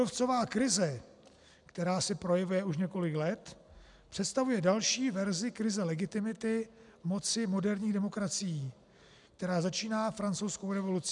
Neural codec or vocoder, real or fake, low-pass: none; real; 10.8 kHz